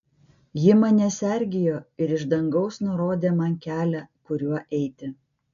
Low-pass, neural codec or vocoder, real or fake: 7.2 kHz; none; real